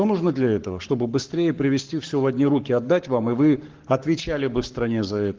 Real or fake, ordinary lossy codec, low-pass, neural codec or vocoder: fake; Opus, 16 kbps; 7.2 kHz; codec, 44.1 kHz, 7.8 kbps, Pupu-Codec